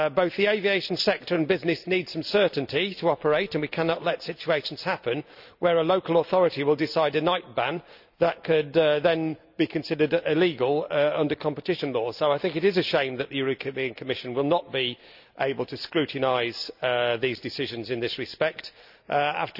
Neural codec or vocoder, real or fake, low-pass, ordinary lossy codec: none; real; 5.4 kHz; none